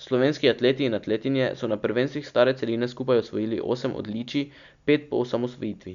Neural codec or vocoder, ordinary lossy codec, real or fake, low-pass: none; none; real; 7.2 kHz